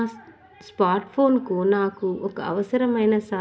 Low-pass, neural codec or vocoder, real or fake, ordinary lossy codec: none; none; real; none